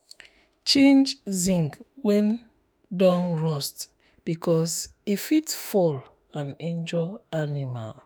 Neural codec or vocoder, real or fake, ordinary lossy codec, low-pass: autoencoder, 48 kHz, 32 numbers a frame, DAC-VAE, trained on Japanese speech; fake; none; none